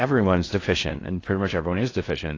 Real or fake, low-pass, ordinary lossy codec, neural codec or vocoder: fake; 7.2 kHz; AAC, 32 kbps; codec, 16 kHz in and 24 kHz out, 0.6 kbps, FocalCodec, streaming, 4096 codes